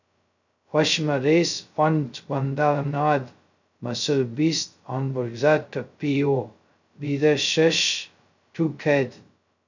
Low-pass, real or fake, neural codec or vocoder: 7.2 kHz; fake; codec, 16 kHz, 0.2 kbps, FocalCodec